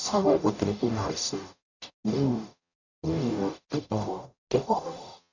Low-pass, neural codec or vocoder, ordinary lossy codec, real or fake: 7.2 kHz; codec, 44.1 kHz, 0.9 kbps, DAC; none; fake